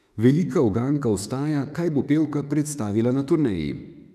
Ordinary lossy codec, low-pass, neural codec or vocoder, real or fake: none; 14.4 kHz; autoencoder, 48 kHz, 32 numbers a frame, DAC-VAE, trained on Japanese speech; fake